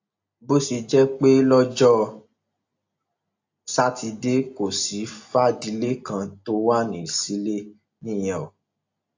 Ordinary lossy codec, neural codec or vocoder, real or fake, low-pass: AAC, 48 kbps; none; real; 7.2 kHz